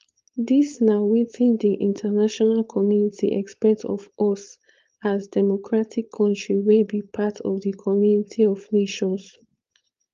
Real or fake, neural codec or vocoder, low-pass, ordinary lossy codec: fake; codec, 16 kHz, 4.8 kbps, FACodec; 7.2 kHz; Opus, 32 kbps